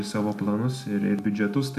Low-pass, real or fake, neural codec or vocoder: 14.4 kHz; real; none